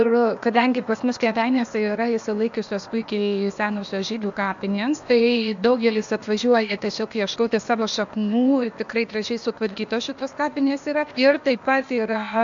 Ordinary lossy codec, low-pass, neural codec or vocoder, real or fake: AAC, 64 kbps; 7.2 kHz; codec, 16 kHz, 0.8 kbps, ZipCodec; fake